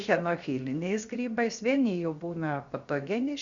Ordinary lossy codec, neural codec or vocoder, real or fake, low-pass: Opus, 64 kbps; codec, 16 kHz, 0.7 kbps, FocalCodec; fake; 7.2 kHz